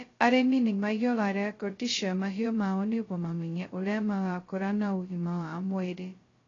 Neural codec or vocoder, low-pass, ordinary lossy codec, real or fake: codec, 16 kHz, 0.2 kbps, FocalCodec; 7.2 kHz; AAC, 32 kbps; fake